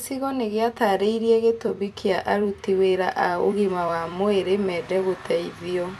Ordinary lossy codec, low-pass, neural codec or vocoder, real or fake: AAC, 96 kbps; 14.4 kHz; vocoder, 44.1 kHz, 128 mel bands every 256 samples, BigVGAN v2; fake